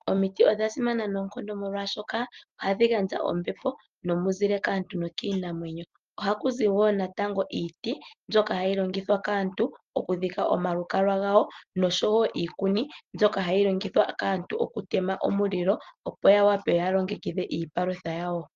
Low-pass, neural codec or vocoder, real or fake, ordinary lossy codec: 7.2 kHz; none; real; Opus, 32 kbps